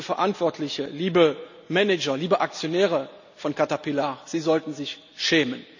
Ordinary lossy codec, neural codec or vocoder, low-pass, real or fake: none; none; 7.2 kHz; real